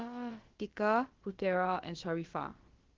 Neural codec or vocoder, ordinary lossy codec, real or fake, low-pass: codec, 16 kHz, about 1 kbps, DyCAST, with the encoder's durations; Opus, 16 kbps; fake; 7.2 kHz